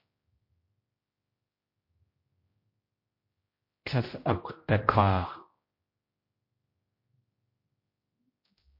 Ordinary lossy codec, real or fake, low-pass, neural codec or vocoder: MP3, 32 kbps; fake; 5.4 kHz; codec, 16 kHz, 0.5 kbps, X-Codec, HuBERT features, trained on general audio